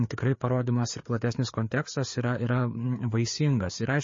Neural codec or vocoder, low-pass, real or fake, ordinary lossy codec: codec, 16 kHz, 4 kbps, FreqCodec, larger model; 7.2 kHz; fake; MP3, 32 kbps